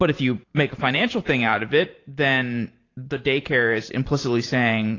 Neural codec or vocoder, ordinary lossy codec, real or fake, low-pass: vocoder, 44.1 kHz, 128 mel bands every 512 samples, BigVGAN v2; AAC, 32 kbps; fake; 7.2 kHz